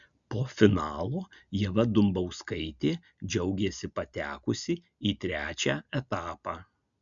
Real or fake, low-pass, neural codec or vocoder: real; 7.2 kHz; none